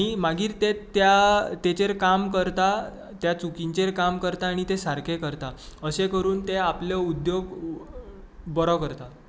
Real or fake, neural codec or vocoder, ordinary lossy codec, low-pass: real; none; none; none